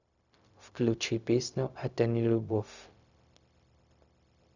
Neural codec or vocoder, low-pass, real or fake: codec, 16 kHz, 0.4 kbps, LongCat-Audio-Codec; 7.2 kHz; fake